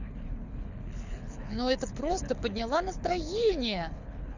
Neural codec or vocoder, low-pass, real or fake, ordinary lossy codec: codec, 24 kHz, 6 kbps, HILCodec; 7.2 kHz; fake; none